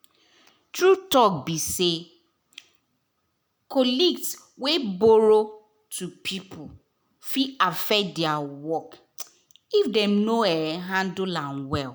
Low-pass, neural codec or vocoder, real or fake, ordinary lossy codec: none; none; real; none